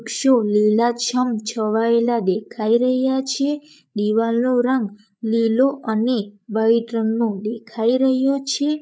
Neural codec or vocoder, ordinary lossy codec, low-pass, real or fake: codec, 16 kHz, 8 kbps, FreqCodec, larger model; none; none; fake